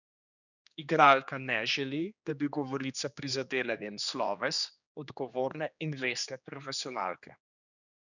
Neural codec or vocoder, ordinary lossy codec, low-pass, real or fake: codec, 16 kHz, 2 kbps, X-Codec, HuBERT features, trained on general audio; none; 7.2 kHz; fake